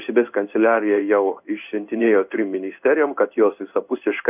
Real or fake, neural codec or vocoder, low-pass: fake; codec, 16 kHz in and 24 kHz out, 1 kbps, XY-Tokenizer; 3.6 kHz